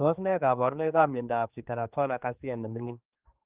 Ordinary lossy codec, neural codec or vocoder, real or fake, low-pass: Opus, 24 kbps; codec, 16 kHz, 2 kbps, X-Codec, HuBERT features, trained on general audio; fake; 3.6 kHz